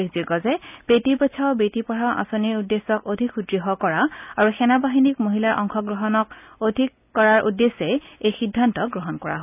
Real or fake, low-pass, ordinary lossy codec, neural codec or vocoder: real; 3.6 kHz; none; none